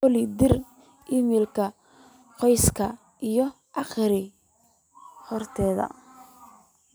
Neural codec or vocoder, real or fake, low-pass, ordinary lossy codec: none; real; none; none